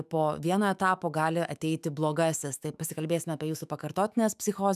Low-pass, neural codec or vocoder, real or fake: 14.4 kHz; autoencoder, 48 kHz, 128 numbers a frame, DAC-VAE, trained on Japanese speech; fake